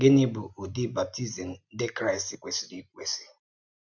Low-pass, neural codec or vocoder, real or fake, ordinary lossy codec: 7.2 kHz; none; real; none